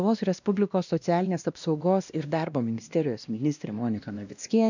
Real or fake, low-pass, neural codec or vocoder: fake; 7.2 kHz; codec, 16 kHz, 1 kbps, X-Codec, WavLM features, trained on Multilingual LibriSpeech